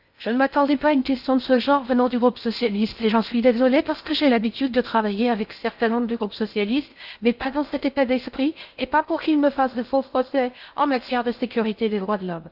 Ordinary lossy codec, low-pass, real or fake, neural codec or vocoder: none; 5.4 kHz; fake; codec, 16 kHz in and 24 kHz out, 0.6 kbps, FocalCodec, streaming, 4096 codes